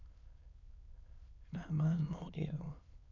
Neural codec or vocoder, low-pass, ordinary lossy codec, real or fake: autoencoder, 22.05 kHz, a latent of 192 numbers a frame, VITS, trained on many speakers; 7.2 kHz; none; fake